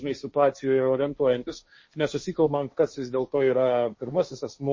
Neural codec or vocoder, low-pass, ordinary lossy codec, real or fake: codec, 16 kHz, 1.1 kbps, Voila-Tokenizer; 7.2 kHz; MP3, 32 kbps; fake